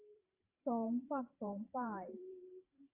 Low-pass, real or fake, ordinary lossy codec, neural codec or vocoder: 3.6 kHz; fake; AAC, 24 kbps; vocoder, 44.1 kHz, 128 mel bands every 512 samples, BigVGAN v2